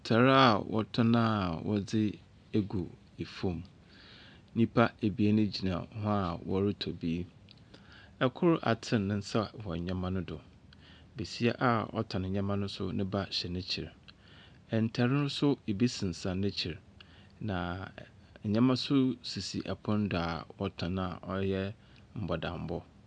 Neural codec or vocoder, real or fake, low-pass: none; real; 9.9 kHz